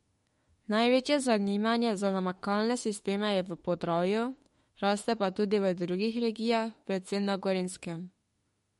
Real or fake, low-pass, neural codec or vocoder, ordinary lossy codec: fake; 19.8 kHz; autoencoder, 48 kHz, 32 numbers a frame, DAC-VAE, trained on Japanese speech; MP3, 48 kbps